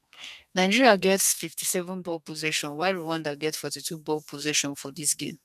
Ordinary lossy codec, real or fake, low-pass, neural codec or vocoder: none; fake; 14.4 kHz; codec, 32 kHz, 1.9 kbps, SNAC